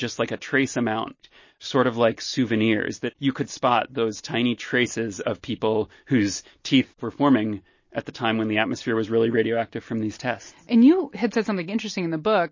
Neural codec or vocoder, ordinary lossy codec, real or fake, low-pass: none; MP3, 32 kbps; real; 7.2 kHz